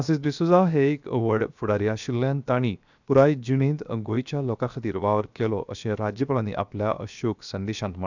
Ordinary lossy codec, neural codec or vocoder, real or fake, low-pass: none; codec, 16 kHz, 0.7 kbps, FocalCodec; fake; 7.2 kHz